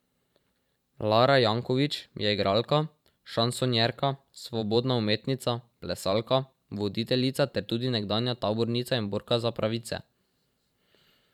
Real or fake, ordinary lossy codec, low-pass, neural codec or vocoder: fake; none; 19.8 kHz; vocoder, 44.1 kHz, 128 mel bands every 512 samples, BigVGAN v2